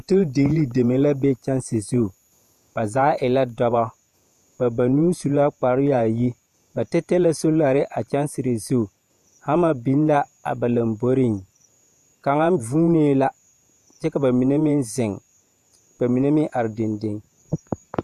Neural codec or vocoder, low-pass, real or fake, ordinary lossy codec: vocoder, 48 kHz, 128 mel bands, Vocos; 14.4 kHz; fake; MP3, 96 kbps